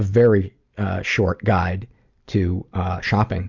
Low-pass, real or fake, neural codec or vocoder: 7.2 kHz; real; none